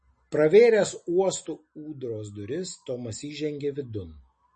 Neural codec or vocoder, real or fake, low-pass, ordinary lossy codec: none; real; 10.8 kHz; MP3, 32 kbps